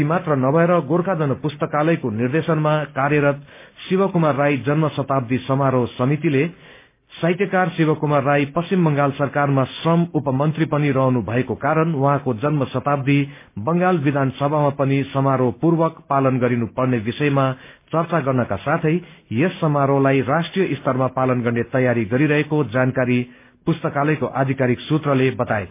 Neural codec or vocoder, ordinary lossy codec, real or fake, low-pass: none; MP3, 24 kbps; real; 3.6 kHz